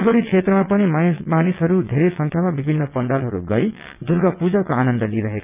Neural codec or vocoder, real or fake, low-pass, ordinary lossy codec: vocoder, 22.05 kHz, 80 mel bands, WaveNeXt; fake; 3.6 kHz; none